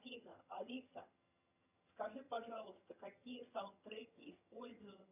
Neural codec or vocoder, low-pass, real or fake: vocoder, 22.05 kHz, 80 mel bands, HiFi-GAN; 3.6 kHz; fake